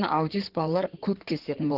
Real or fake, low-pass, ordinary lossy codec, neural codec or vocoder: fake; 5.4 kHz; Opus, 16 kbps; codec, 16 kHz in and 24 kHz out, 2.2 kbps, FireRedTTS-2 codec